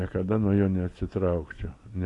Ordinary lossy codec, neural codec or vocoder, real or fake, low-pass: AAC, 48 kbps; none; real; 10.8 kHz